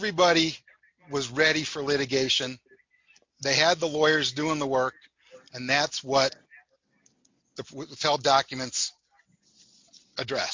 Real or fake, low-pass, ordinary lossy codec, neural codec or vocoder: real; 7.2 kHz; MP3, 48 kbps; none